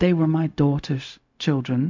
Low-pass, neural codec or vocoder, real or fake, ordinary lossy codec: 7.2 kHz; codec, 16 kHz, 0.4 kbps, LongCat-Audio-Codec; fake; MP3, 64 kbps